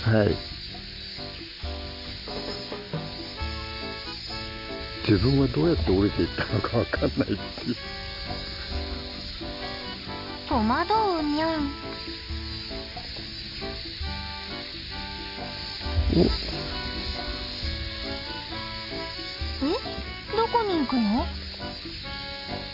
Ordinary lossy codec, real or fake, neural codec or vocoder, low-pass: AAC, 48 kbps; real; none; 5.4 kHz